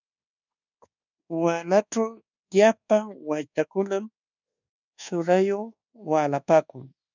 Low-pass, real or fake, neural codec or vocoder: 7.2 kHz; fake; codec, 24 kHz, 1.2 kbps, DualCodec